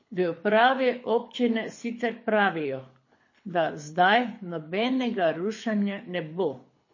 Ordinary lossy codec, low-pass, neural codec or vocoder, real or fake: MP3, 32 kbps; 7.2 kHz; codec, 24 kHz, 6 kbps, HILCodec; fake